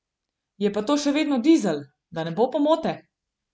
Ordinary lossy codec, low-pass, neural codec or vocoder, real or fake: none; none; none; real